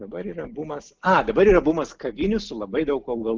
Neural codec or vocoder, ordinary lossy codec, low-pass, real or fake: none; Opus, 32 kbps; 7.2 kHz; real